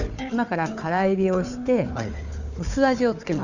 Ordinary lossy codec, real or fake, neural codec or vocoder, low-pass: none; fake; codec, 16 kHz, 4 kbps, FunCodec, trained on Chinese and English, 50 frames a second; 7.2 kHz